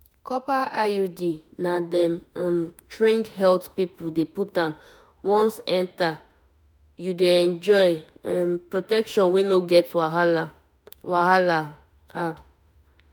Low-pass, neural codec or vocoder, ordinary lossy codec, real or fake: none; autoencoder, 48 kHz, 32 numbers a frame, DAC-VAE, trained on Japanese speech; none; fake